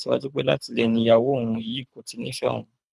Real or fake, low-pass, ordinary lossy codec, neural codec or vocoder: fake; none; none; codec, 24 kHz, 3 kbps, HILCodec